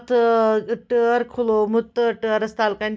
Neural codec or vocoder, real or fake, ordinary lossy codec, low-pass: none; real; none; none